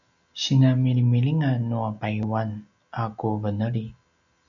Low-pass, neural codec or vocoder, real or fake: 7.2 kHz; none; real